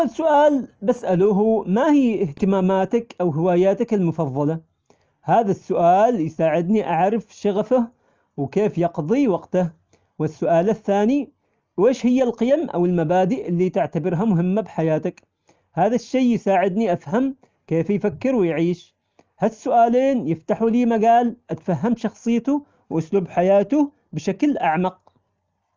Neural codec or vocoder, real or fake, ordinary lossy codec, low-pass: none; real; Opus, 24 kbps; 7.2 kHz